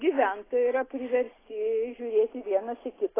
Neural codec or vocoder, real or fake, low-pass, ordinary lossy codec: none; real; 3.6 kHz; AAC, 16 kbps